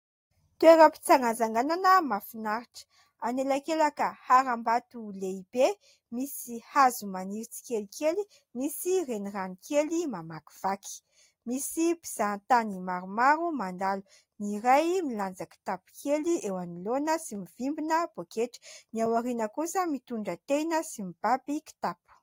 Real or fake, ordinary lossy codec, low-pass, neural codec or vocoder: real; AAC, 48 kbps; 19.8 kHz; none